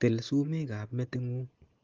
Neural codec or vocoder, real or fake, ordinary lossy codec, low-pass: none; real; Opus, 16 kbps; 7.2 kHz